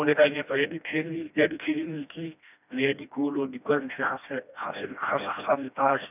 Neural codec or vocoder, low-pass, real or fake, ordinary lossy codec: codec, 16 kHz, 1 kbps, FreqCodec, smaller model; 3.6 kHz; fake; none